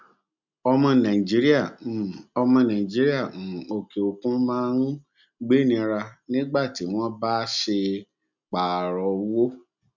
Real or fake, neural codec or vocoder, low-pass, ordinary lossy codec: real; none; 7.2 kHz; none